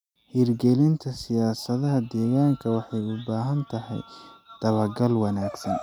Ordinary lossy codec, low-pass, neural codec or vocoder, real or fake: none; 19.8 kHz; none; real